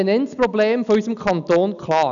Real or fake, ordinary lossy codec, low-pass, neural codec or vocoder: real; none; 7.2 kHz; none